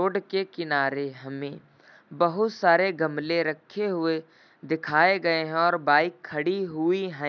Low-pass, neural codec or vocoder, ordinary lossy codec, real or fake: 7.2 kHz; none; none; real